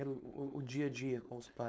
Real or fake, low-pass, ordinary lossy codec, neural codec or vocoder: fake; none; none; codec, 16 kHz, 4.8 kbps, FACodec